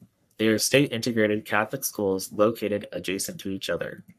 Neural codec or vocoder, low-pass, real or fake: codec, 44.1 kHz, 3.4 kbps, Pupu-Codec; 14.4 kHz; fake